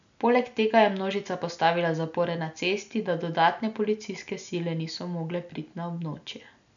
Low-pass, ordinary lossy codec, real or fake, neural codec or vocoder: 7.2 kHz; none; real; none